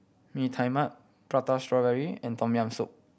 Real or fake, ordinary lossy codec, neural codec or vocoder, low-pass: real; none; none; none